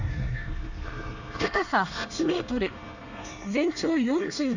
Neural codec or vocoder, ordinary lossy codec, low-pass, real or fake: codec, 24 kHz, 1 kbps, SNAC; none; 7.2 kHz; fake